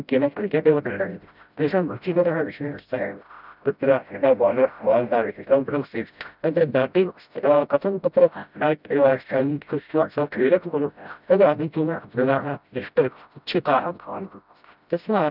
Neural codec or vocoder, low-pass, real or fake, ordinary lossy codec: codec, 16 kHz, 0.5 kbps, FreqCodec, smaller model; 5.4 kHz; fake; none